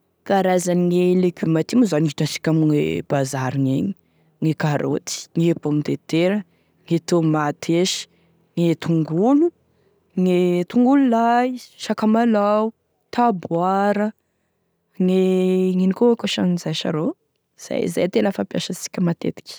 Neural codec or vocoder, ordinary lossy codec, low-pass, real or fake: none; none; none; real